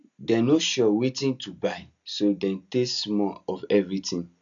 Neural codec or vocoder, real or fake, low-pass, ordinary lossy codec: none; real; 7.2 kHz; none